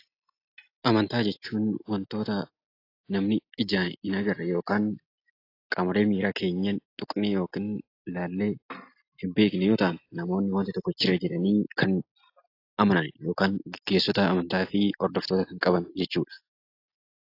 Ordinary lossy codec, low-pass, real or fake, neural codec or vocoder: AAC, 32 kbps; 5.4 kHz; real; none